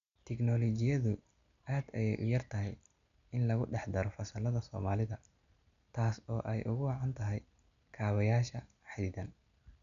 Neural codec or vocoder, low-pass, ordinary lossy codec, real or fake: none; 7.2 kHz; none; real